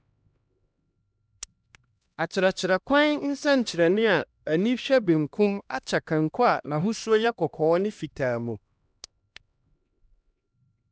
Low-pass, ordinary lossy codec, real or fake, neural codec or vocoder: none; none; fake; codec, 16 kHz, 1 kbps, X-Codec, HuBERT features, trained on LibriSpeech